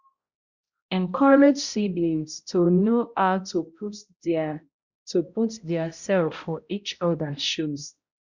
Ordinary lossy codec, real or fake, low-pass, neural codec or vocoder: Opus, 64 kbps; fake; 7.2 kHz; codec, 16 kHz, 0.5 kbps, X-Codec, HuBERT features, trained on balanced general audio